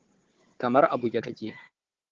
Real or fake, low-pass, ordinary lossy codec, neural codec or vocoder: fake; 7.2 kHz; Opus, 16 kbps; codec, 16 kHz, 4 kbps, FunCodec, trained on Chinese and English, 50 frames a second